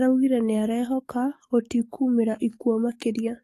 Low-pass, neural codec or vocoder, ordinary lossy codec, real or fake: 14.4 kHz; codec, 44.1 kHz, 7.8 kbps, DAC; AAC, 48 kbps; fake